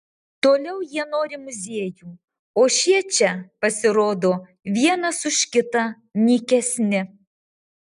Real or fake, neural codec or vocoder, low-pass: real; none; 10.8 kHz